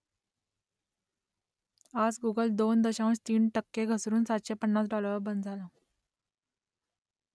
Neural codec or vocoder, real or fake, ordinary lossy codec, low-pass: none; real; none; none